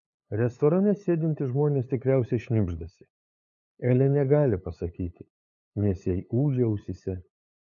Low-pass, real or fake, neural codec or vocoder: 7.2 kHz; fake; codec, 16 kHz, 8 kbps, FunCodec, trained on LibriTTS, 25 frames a second